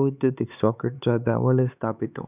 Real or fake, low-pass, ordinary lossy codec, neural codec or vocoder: fake; 3.6 kHz; none; codec, 16 kHz, 4 kbps, X-Codec, HuBERT features, trained on LibriSpeech